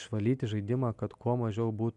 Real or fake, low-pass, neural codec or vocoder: real; 10.8 kHz; none